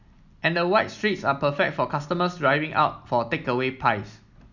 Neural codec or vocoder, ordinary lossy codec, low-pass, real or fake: none; none; 7.2 kHz; real